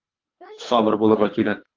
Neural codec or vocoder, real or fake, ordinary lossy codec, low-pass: codec, 24 kHz, 3 kbps, HILCodec; fake; Opus, 32 kbps; 7.2 kHz